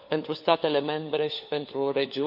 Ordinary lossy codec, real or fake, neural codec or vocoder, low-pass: none; fake; codec, 16 kHz, 4 kbps, FunCodec, trained on LibriTTS, 50 frames a second; 5.4 kHz